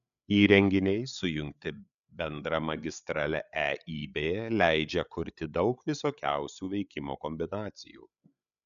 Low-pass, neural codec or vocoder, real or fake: 7.2 kHz; codec, 16 kHz, 8 kbps, FreqCodec, larger model; fake